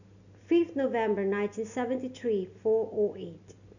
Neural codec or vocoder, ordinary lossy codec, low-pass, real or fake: none; none; 7.2 kHz; real